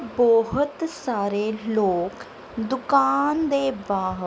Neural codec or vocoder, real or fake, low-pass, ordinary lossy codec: none; real; none; none